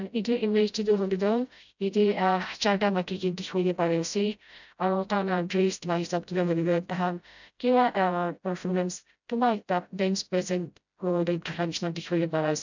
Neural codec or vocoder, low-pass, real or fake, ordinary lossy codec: codec, 16 kHz, 0.5 kbps, FreqCodec, smaller model; 7.2 kHz; fake; none